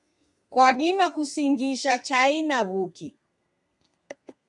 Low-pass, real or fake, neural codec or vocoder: 10.8 kHz; fake; codec, 32 kHz, 1.9 kbps, SNAC